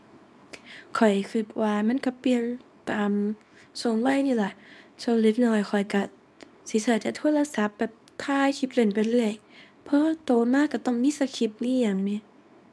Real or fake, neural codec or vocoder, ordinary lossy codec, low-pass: fake; codec, 24 kHz, 0.9 kbps, WavTokenizer, small release; none; none